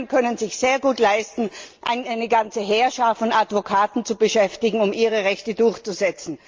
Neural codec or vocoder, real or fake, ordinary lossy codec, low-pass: none; real; Opus, 32 kbps; 7.2 kHz